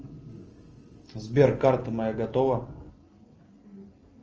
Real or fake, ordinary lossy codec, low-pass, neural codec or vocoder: real; Opus, 24 kbps; 7.2 kHz; none